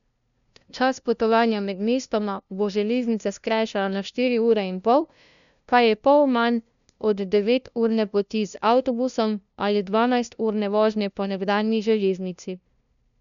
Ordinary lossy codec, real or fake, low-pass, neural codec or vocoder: none; fake; 7.2 kHz; codec, 16 kHz, 0.5 kbps, FunCodec, trained on LibriTTS, 25 frames a second